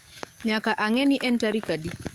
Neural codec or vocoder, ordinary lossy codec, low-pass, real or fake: codec, 44.1 kHz, 7.8 kbps, DAC; Opus, 64 kbps; 19.8 kHz; fake